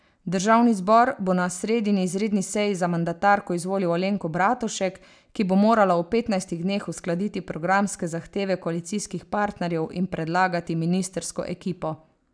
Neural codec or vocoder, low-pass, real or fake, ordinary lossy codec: none; 9.9 kHz; real; none